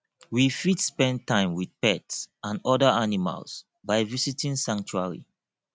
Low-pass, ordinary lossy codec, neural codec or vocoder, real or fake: none; none; none; real